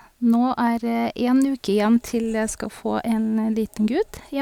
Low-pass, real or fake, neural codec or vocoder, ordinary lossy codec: 19.8 kHz; real; none; none